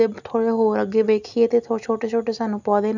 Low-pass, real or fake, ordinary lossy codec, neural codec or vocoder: 7.2 kHz; real; none; none